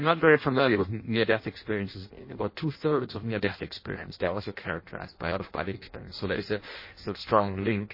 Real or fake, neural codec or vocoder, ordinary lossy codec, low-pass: fake; codec, 16 kHz in and 24 kHz out, 0.6 kbps, FireRedTTS-2 codec; MP3, 24 kbps; 5.4 kHz